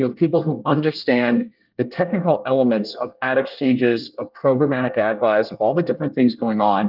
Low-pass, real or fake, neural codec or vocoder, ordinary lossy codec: 5.4 kHz; fake; codec, 24 kHz, 1 kbps, SNAC; Opus, 32 kbps